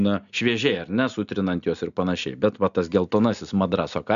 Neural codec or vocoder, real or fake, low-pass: none; real; 7.2 kHz